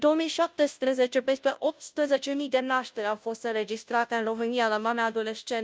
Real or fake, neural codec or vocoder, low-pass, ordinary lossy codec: fake; codec, 16 kHz, 0.5 kbps, FunCodec, trained on Chinese and English, 25 frames a second; none; none